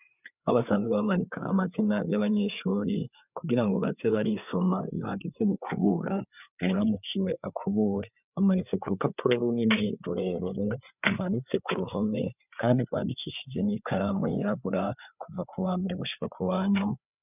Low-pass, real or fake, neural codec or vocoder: 3.6 kHz; fake; codec, 16 kHz, 4 kbps, FreqCodec, larger model